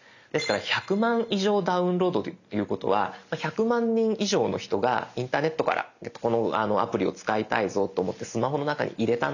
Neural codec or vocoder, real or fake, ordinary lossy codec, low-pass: none; real; none; 7.2 kHz